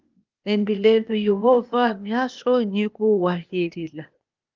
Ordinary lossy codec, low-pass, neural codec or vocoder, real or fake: Opus, 24 kbps; 7.2 kHz; codec, 16 kHz, 0.8 kbps, ZipCodec; fake